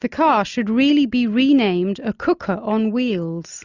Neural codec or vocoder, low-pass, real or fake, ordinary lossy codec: none; 7.2 kHz; real; Opus, 64 kbps